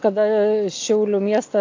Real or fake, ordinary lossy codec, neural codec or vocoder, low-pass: real; AAC, 48 kbps; none; 7.2 kHz